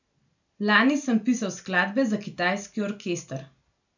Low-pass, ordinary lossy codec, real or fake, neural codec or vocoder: 7.2 kHz; none; real; none